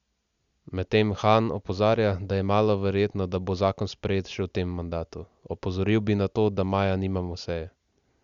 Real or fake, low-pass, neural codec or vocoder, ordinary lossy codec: real; 7.2 kHz; none; Opus, 64 kbps